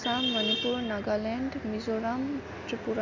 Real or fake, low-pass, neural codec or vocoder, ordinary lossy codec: real; 7.2 kHz; none; Opus, 64 kbps